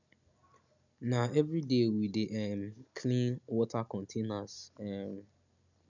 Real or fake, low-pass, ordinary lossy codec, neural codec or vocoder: real; 7.2 kHz; none; none